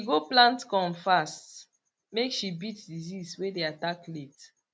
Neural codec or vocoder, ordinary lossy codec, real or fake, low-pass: none; none; real; none